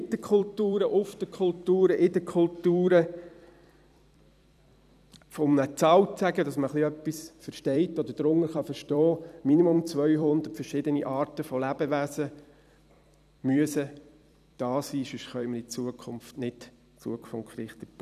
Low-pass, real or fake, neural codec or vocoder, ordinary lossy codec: 14.4 kHz; fake; vocoder, 44.1 kHz, 128 mel bands every 256 samples, BigVGAN v2; none